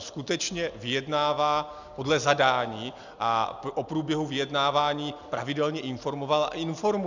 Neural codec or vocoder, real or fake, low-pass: none; real; 7.2 kHz